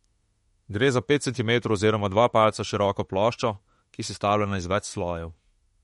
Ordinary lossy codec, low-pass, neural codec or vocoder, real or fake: MP3, 48 kbps; 19.8 kHz; autoencoder, 48 kHz, 32 numbers a frame, DAC-VAE, trained on Japanese speech; fake